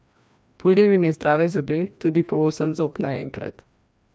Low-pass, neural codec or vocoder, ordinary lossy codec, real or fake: none; codec, 16 kHz, 1 kbps, FreqCodec, larger model; none; fake